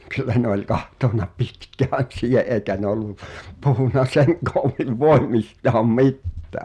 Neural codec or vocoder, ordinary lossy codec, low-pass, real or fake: none; none; none; real